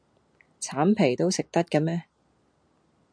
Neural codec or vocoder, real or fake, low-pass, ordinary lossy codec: none; real; 9.9 kHz; MP3, 96 kbps